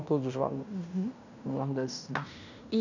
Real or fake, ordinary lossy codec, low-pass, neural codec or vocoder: fake; none; 7.2 kHz; codec, 16 kHz in and 24 kHz out, 0.9 kbps, LongCat-Audio-Codec, fine tuned four codebook decoder